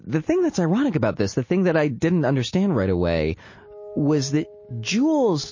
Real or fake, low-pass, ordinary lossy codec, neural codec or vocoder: real; 7.2 kHz; MP3, 32 kbps; none